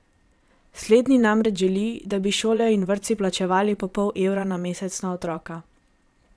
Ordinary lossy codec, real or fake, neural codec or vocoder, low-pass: none; fake; vocoder, 22.05 kHz, 80 mel bands, Vocos; none